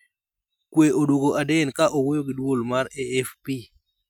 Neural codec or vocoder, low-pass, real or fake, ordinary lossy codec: none; none; real; none